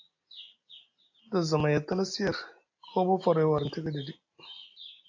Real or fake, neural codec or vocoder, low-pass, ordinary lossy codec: real; none; 7.2 kHz; AAC, 48 kbps